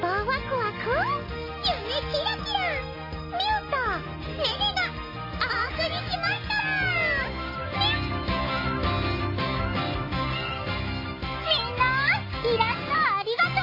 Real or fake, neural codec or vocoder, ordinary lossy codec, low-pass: real; none; MP3, 24 kbps; 5.4 kHz